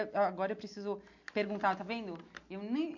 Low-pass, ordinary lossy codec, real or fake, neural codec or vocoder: 7.2 kHz; MP3, 48 kbps; real; none